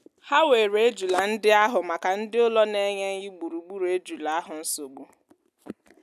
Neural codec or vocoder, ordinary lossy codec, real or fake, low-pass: none; none; real; 14.4 kHz